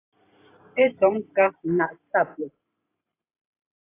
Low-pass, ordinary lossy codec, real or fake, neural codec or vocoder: 3.6 kHz; AAC, 24 kbps; fake; vocoder, 44.1 kHz, 128 mel bands every 512 samples, BigVGAN v2